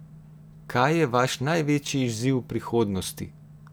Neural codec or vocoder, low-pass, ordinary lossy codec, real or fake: none; none; none; real